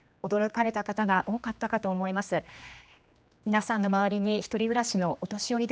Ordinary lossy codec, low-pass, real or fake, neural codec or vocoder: none; none; fake; codec, 16 kHz, 2 kbps, X-Codec, HuBERT features, trained on general audio